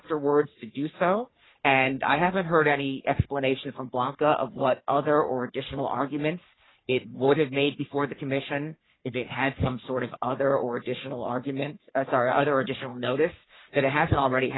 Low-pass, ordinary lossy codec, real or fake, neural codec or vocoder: 7.2 kHz; AAC, 16 kbps; fake; codec, 44.1 kHz, 3.4 kbps, Pupu-Codec